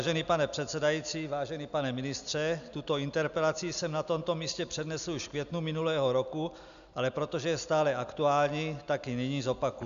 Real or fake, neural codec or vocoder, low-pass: real; none; 7.2 kHz